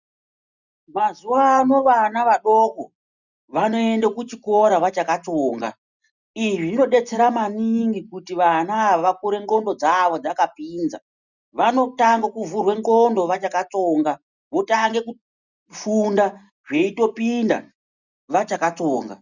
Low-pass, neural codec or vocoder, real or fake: 7.2 kHz; none; real